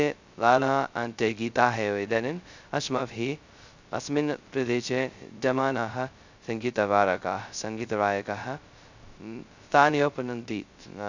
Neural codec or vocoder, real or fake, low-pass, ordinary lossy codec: codec, 16 kHz, 0.2 kbps, FocalCodec; fake; 7.2 kHz; Opus, 64 kbps